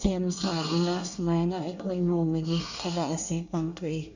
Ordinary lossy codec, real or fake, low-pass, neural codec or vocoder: none; fake; 7.2 kHz; codec, 24 kHz, 1 kbps, SNAC